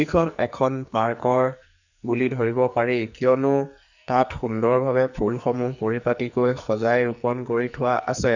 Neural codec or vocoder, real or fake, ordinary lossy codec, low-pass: codec, 44.1 kHz, 2.6 kbps, SNAC; fake; none; 7.2 kHz